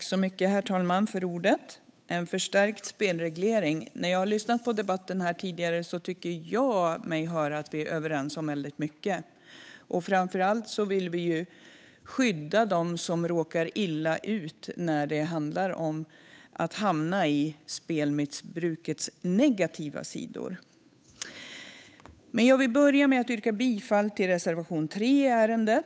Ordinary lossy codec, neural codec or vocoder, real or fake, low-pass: none; codec, 16 kHz, 8 kbps, FunCodec, trained on Chinese and English, 25 frames a second; fake; none